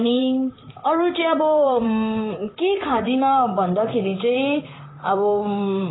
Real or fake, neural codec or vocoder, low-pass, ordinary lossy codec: fake; vocoder, 44.1 kHz, 128 mel bands, Pupu-Vocoder; 7.2 kHz; AAC, 16 kbps